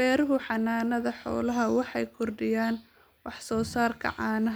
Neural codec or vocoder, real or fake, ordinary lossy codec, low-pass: none; real; none; none